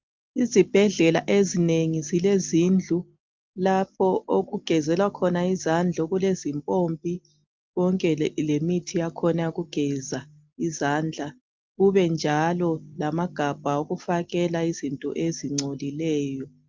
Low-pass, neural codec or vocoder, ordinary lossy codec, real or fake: 7.2 kHz; none; Opus, 32 kbps; real